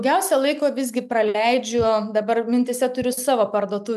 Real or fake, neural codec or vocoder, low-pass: real; none; 14.4 kHz